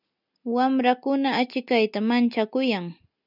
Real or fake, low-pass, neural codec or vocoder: real; 5.4 kHz; none